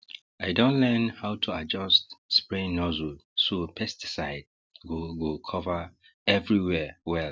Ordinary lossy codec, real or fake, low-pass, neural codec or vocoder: none; real; none; none